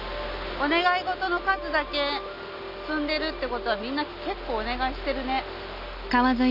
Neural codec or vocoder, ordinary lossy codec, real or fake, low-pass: none; none; real; 5.4 kHz